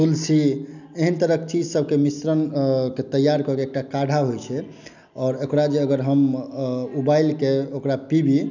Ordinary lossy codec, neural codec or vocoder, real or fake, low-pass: none; none; real; 7.2 kHz